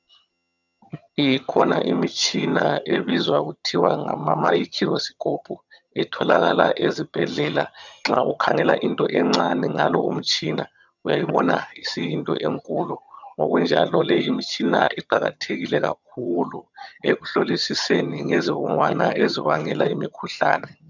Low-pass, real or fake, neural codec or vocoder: 7.2 kHz; fake; vocoder, 22.05 kHz, 80 mel bands, HiFi-GAN